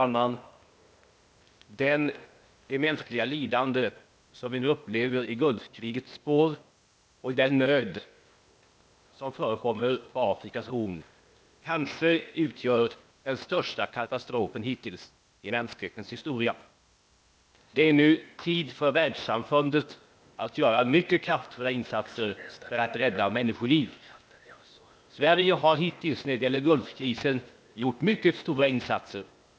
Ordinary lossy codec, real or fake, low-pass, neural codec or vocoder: none; fake; none; codec, 16 kHz, 0.8 kbps, ZipCodec